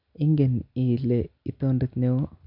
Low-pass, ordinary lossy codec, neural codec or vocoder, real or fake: 5.4 kHz; none; none; real